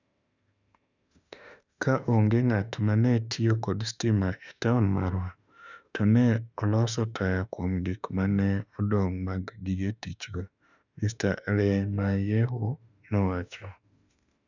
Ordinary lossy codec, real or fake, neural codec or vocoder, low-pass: none; fake; autoencoder, 48 kHz, 32 numbers a frame, DAC-VAE, trained on Japanese speech; 7.2 kHz